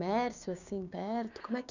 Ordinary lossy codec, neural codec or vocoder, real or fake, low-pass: none; codec, 16 kHz, 6 kbps, DAC; fake; 7.2 kHz